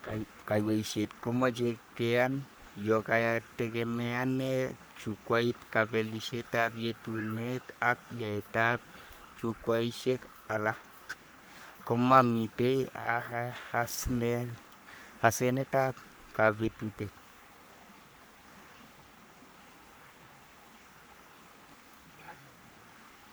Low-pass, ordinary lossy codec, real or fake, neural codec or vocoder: none; none; fake; codec, 44.1 kHz, 3.4 kbps, Pupu-Codec